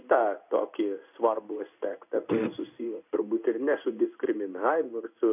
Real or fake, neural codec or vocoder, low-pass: fake; codec, 16 kHz in and 24 kHz out, 1 kbps, XY-Tokenizer; 3.6 kHz